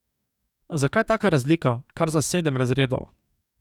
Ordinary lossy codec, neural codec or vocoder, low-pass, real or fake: none; codec, 44.1 kHz, 2.6 kbps, DAC; 19.8 kHz; fake